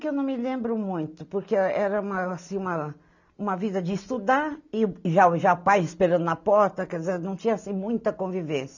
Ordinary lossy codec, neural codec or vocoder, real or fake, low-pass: none; none; real; 7.2 kHz